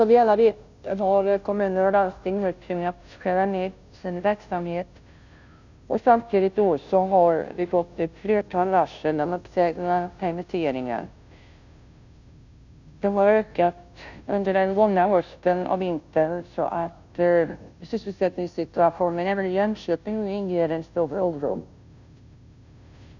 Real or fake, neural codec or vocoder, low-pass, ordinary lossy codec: fake; codec, 16 kHz, 0.5 kbps, FunCodec, trained on Chinese and English, 25 frames a second; 7.2 kHz; none